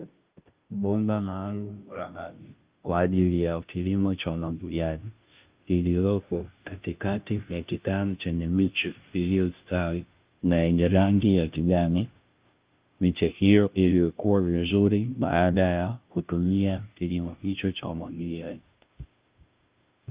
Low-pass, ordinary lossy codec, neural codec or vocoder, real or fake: 3.6 kHz; Opus, 32 kbps; codec, 16 kHz, 0.5 kbps, FunCodec, trained on Chinese and English, 25 frames a second; fake